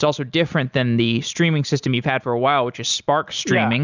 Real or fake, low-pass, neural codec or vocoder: real; 7.2 kHz; none